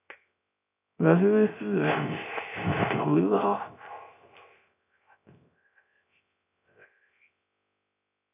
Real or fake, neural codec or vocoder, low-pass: fake; codec, 16 kHz, 0.3 kbps, FocalCodec; 3.6 kHz